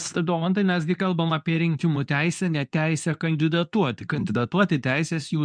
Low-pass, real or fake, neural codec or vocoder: 9.9 kHz; fake; codec, 24 kHz, 0.9 kbps, WavTokenizer, medium speech release version 2